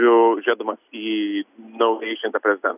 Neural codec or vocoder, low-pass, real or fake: none; 3.6 kHz; real